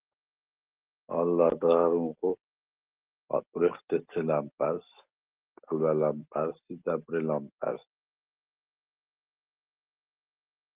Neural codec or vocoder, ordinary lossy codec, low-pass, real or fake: none; Opus, 16 kbps; 3.6 kHz; real